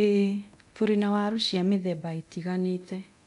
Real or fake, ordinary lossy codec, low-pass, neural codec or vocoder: fake; MP3, 96 kbps; 10.8 kHz; codec, 24 kHz, 0.9 kbps, DualCodec